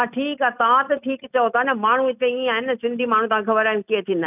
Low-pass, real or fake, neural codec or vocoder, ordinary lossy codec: 3.6 kHz; real; none; none